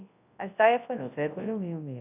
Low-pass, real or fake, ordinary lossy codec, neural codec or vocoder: 3.6 kHz; fake; AAC, 24 kbps; codec, 24 kHz, 0.9 kbps, WavTokenizer, large speech release